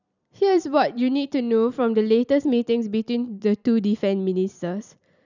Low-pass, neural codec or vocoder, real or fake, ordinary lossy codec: 7.2 kHz; vocoder, 44.1 kHz, 128 mel bands every 256 samples, BigVGAN v2; fake; none